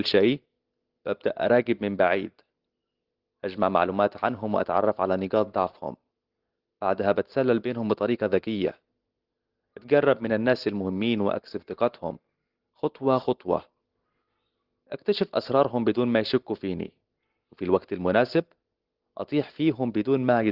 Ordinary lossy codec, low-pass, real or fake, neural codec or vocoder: Opus, 16 kbps; 5.4 kHz; real; none